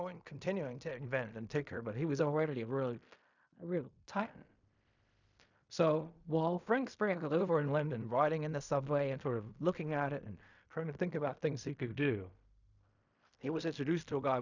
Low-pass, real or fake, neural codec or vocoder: 7.2 kHz; fake; codec, 16 kHz in and 24 kHz out, 0.4 kbps, LongCat-Audio-Codec, fine tuned four codebook decoder